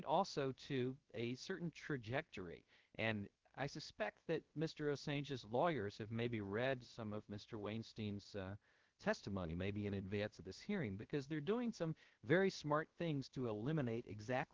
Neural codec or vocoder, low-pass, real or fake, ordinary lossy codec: codec, 16 kHz, about 1 kbps, DyCAST, with the encoder's durations; 7.2 kHz; fake; Opus, 16 kbps